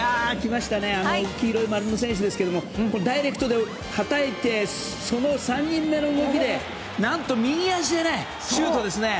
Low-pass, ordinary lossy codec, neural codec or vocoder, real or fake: none; none; none; real